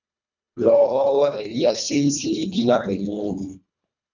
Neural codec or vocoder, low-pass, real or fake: codec, 24 kHz, 1.5 kbps, HILCodec; 7.2 kHz; fake